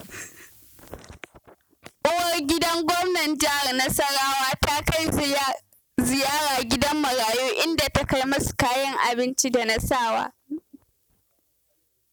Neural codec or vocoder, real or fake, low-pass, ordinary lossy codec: none; real; none; none